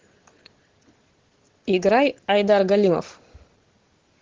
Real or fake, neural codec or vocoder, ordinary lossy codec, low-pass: real; none; Opus, 16 kbps; 7.2 kHz